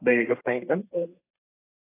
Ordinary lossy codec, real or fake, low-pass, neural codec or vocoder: AAC, 16 kbps; fake; 3.6 kHz; codec, 32 kHz, 1.9 kbps, SNAC